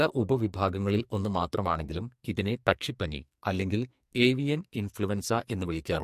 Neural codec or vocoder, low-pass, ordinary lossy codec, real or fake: codec, 44.1 kHz, 2.6 kbps, SNAC; 14.4 kHz; MP3, 64 kbps; fake